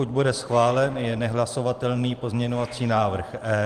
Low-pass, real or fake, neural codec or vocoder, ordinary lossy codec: 14.4 kHz; fake; vocoder, 44.1 kHz, 128 mel bands every 512 samples, BigVGAN v2; Opus, 24 kbps